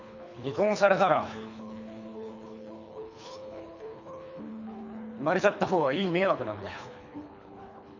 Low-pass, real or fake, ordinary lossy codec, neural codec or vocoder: 7.2 kHz; fake; none; codec, 24 kHz, 3 kbps, HILCodec